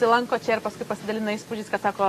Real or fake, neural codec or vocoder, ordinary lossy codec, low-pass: real; none; AAC, 48 kbps; 14.4 kHz